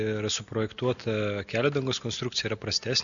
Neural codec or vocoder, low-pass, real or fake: none; 7.2 kHz; real